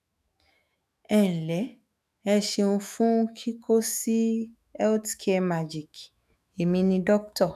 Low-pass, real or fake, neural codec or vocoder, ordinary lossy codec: 14.4 kHz; fake; autoencoder, 48 kHz, 128 numbers a frame, DAC-VAE, trained on Japanese speech; none